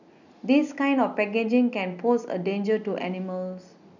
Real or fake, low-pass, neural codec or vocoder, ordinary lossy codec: real; 7.2 kHz; none; none